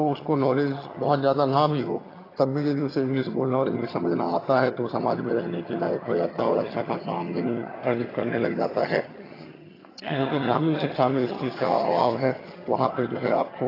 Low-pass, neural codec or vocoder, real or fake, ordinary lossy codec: 5.4 kHz; vocoder, 22.05 kHz, 80 mel bands, HiFi-GAN; fake; AAC, 24 kbps